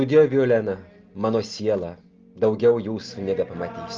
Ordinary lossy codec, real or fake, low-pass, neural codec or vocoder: Opus, 32 kbps; real; 7.2 kHz; none